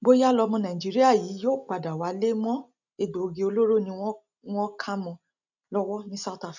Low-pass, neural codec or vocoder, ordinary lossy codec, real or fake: 7.2 kHz; none; none; real